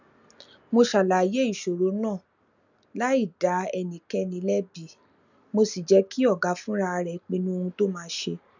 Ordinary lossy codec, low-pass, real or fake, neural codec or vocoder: none; 7.2 kHz; real; none